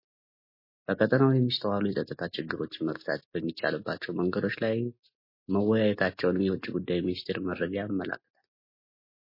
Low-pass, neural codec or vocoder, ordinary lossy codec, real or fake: 5.4 kHz; none; MP3, 24 kbps; real